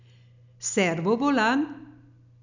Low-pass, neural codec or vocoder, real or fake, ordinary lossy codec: 7.2 kHz; none; real; none